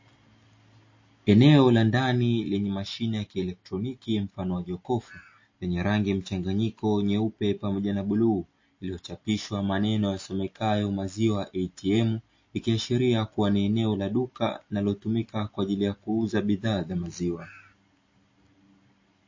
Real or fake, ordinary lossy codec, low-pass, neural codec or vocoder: real; MP3, 32 kbps; 7.2 kHz; none